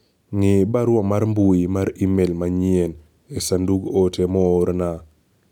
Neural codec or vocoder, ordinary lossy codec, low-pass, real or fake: none; none; 19.8 kHz; real